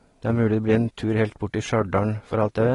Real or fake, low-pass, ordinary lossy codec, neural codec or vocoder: real; 10.8 kHz; AAC, 32 kbps; none